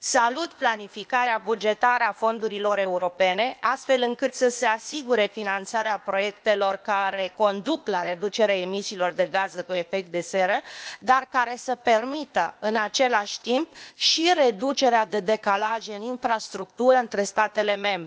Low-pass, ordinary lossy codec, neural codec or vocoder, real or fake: none; none; codec, 16 kHz, 0.8 kbps, ZipCodec; fake